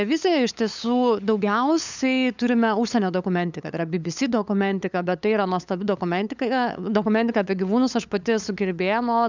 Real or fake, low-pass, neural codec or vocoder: fake; 7.2 kHz; codec, 16 kHz, 8 kbps, FunCodec, trained on LibriTTS, 25 frames a second